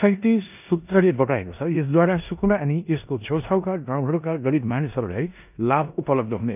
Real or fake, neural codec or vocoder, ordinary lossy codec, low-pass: fake; codec, 16 kHz in and 24 kHz out, 0.9 kbps, LongCat-Audio-Codec, four codebook decoder; none; 3.6 kHz